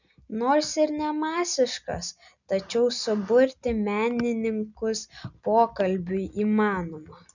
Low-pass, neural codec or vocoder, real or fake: 7.2 kHz; none; real